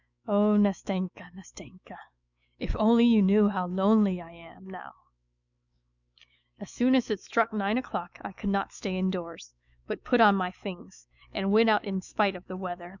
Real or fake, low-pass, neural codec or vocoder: fake; 7.2 kHz; autoencoder, 48 kHz, 128 numbers a frame, DAC-VAE, trained on Japanese speech